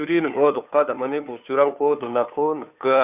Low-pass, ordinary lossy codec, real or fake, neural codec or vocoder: 3.6 kHz; none; fake; codec, 16 kHz, 2 kbps, FunCodec, trained on Chinese and English, 25 frames a second